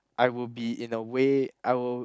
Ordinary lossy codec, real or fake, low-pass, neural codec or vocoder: none; real; none; none